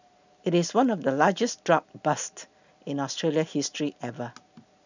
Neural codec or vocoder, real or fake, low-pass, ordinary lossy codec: none; real; 7.2 kHz; none